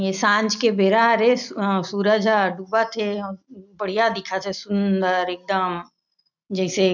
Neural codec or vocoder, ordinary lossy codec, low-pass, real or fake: none; none; 7.2 kHz; real